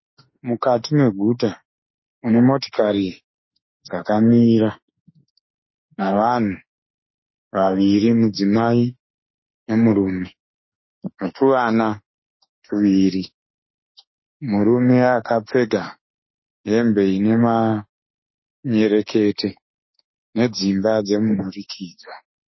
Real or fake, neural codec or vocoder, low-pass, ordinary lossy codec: fake; autoencoder, 48 kHz, 32 numbers a frame, DAC-VAE, trained on Japanese speech; 7.2 kHz; MP3, 24 kbps